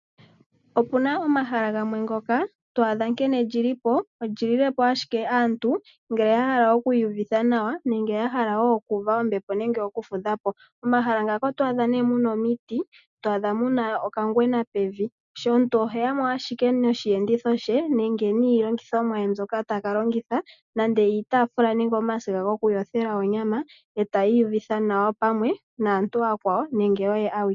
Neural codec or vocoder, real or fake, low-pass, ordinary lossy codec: none; real; 7.2 kHz; MP3, 96 kbps